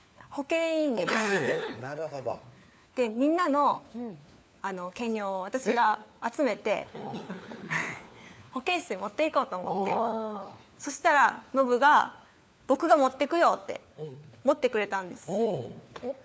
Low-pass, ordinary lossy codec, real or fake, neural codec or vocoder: none; none; fake; codec, 16 kHz, 4 kbps, FunCodec, trained on LibriTTS, 50 frames a second